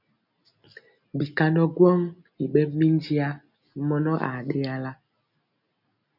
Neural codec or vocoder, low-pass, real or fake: none; 5.4 kHz; real